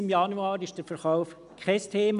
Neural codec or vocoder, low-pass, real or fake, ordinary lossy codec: none; 10.8 kHz; real; none